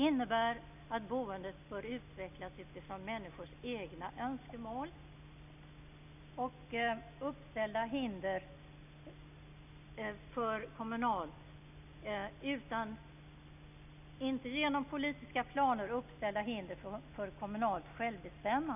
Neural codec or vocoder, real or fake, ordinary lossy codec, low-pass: none; real; none; 3.6 kHz